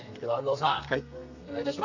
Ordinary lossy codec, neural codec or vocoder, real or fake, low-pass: none; codec, 32 kHz, 1.9 kbps, SNAC; fake; 7.2 kHz